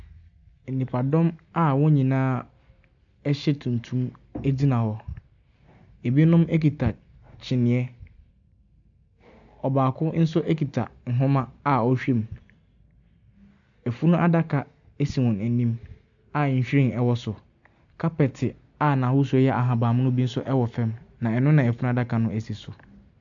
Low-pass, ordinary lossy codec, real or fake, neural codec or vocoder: 7.2 kHz; AAC, 64 kbps; real; none